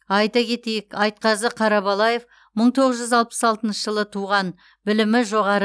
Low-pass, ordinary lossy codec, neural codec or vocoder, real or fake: none; none; none; real